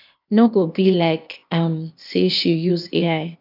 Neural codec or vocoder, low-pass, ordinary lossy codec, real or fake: codec, 16 kHz, 0.8 kbps, ZipCodec; 5.4 kHz; none; fake